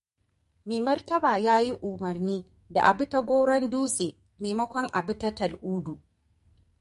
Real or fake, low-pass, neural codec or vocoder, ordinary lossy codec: fake; 14.4 kHz; codec, 44.1 kHz, 2.6 kbps, SNAC; MP3, 48 kbps